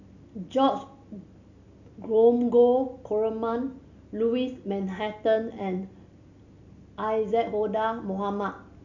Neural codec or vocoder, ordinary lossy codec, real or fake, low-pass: none; none; real; 7.2 kHz